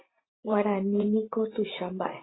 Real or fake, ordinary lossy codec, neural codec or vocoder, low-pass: fake; AAC, 16 kbps; vocoder, 44.1 kHz, 128 mel bands every 512 samples, BigVGAN v2; 7.2 kHz